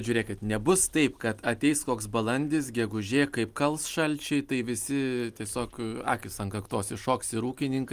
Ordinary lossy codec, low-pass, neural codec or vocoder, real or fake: Opus, 32 kbps; 14.4 kHz; none; real